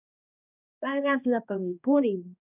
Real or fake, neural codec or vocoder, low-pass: fake; codec, 16 kHz, 1 kbps, X-Codec, HuBERT features, trained on balanced general audio; 3.6 kHz